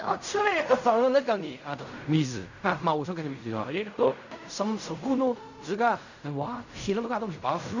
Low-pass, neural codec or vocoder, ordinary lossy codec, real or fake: 7.2 kHz; codec, 16 kHz in and 24 kHz out, 0.4 kbps, LongCat-Audio-Codec, fine tuned four codebook decoder; none; fake